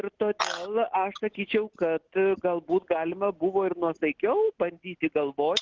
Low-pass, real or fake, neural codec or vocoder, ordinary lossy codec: 7.2 kHz; real; none; Opus, 16 kbps